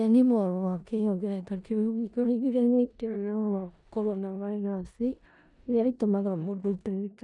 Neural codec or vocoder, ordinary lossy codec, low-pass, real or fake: codec, 16 kHz in and 24 kHz out, 0.4 kbps, LongCat-Audio-Codec, four codebook decoder; none; 10.8 kHz; fake